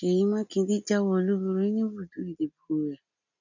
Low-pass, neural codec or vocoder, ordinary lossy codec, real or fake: 7.2 kHz; none; none; real